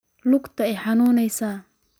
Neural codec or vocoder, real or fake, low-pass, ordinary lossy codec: none; real; none; none